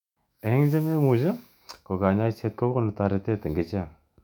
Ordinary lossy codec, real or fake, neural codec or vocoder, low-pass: none; fake; autoencoder, 48 kHz, 128 numbers a frame, DAC-VAE, trained on Japanese speech; 19.8 kHz